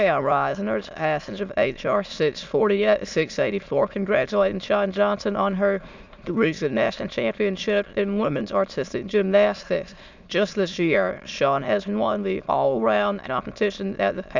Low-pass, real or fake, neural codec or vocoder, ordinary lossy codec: 7.2 kHz; fake; autoencoder, 22.05 kHz, a latent of 192 numbers a frame, VITS, trained on many speakers; Opus, 64 kbps